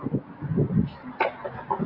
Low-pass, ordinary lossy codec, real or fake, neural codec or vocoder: 5.4 kHz; AAC, 32 kbps; real; none